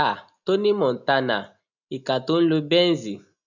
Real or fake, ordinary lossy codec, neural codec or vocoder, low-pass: real; none; none; 7.2 kHz